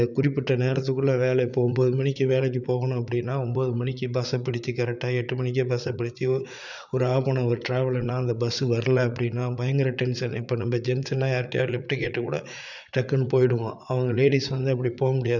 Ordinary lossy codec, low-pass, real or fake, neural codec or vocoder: none; 7.2 kHz; fake; vocoder, 44.1 kHz, 80 mel bands, Vocos